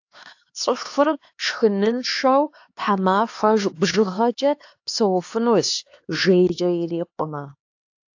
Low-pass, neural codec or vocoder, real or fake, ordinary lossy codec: 7.2 kHz; codec, 16 kHz, 2 kbps, X-Codec, HuBERT features, trained on LibriSpeech; fake; AAC, 48 kbps